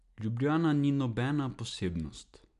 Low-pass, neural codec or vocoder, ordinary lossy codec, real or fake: 10.8 kHz; none; none; real